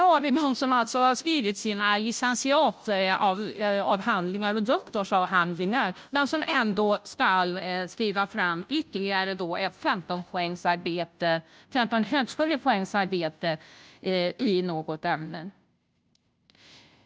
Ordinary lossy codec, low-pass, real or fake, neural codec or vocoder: none; none; fake; codec, 16 kHz, 0.5 kbps, FunCodec, trained on Chinese and English, 25 frames a second